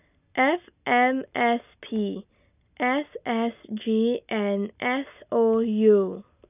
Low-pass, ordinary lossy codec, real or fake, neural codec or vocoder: 3.6 kHz; none; real; none